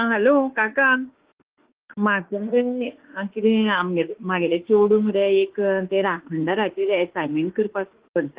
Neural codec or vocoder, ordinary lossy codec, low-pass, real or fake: autoencoder, 48 kHz, 32 numbers a frame, DAC-VAE, trained on Japanese speech; Opus, 16 kbps; 3.6 kHz; fake